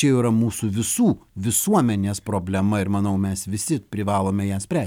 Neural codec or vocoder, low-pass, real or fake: none; 19.8 kHz; real